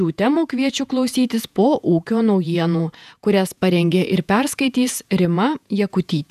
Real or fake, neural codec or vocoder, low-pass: fake; vocoder, 48 kHz, 128 mel bands, Vocos; 14.4 kHz